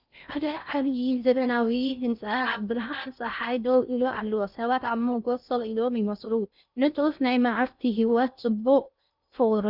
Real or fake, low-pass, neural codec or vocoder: fake; 5.4 kHz; codec, 16 kHz in and 24 kHz out, 0.6 kbps, FocalCodec, streaming, 4096 codes